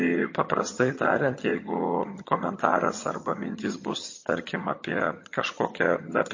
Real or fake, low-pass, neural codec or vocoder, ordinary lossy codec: fake; 7.2 kHz; vocoder, 22.05 kHz, 80 mel bands, HiFi-GAN; MP3, 32 kbps